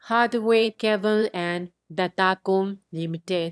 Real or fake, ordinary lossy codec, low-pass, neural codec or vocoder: fake; none; none; autoencoder, 22.05 kHz, a latent of 192 numbers a frame, VITS, trained on one speaker